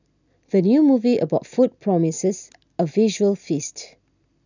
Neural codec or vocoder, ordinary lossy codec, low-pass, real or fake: none; none; 7.2 kHz; real